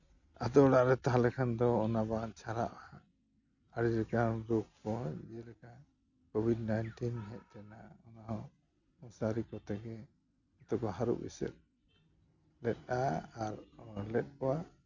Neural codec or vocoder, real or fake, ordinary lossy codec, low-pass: none; real; none; 7.2 kHz